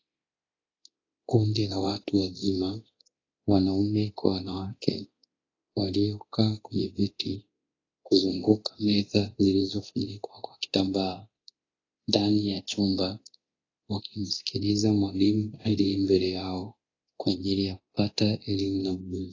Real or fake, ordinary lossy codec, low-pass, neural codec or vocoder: fake; AAC, 32 kbps; 7.2 kHz; codec, 24 kHz, 0.9 kbps, DualCodec